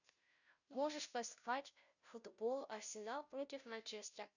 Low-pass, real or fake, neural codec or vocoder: 7.2 kHz; fake; codec, 16 kHz, 0.5 kbps, FunCodec, trained on Chinese and English, 25 frames a second